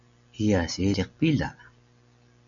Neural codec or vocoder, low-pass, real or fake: none; 7.2 kHz; real